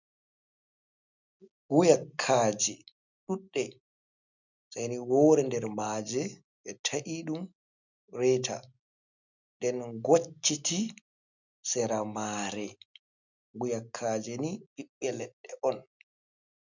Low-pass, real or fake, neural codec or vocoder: 7.2 kHz; real; none